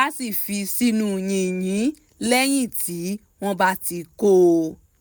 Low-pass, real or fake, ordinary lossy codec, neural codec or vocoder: none; real; none; none